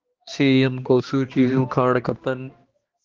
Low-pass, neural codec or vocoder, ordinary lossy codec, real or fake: 7.2 kHz; codec, 16 kHz, 1 kbps, X-Codec, HuBERT features, trained on balanced general audio; Opus, 16 kbps; fake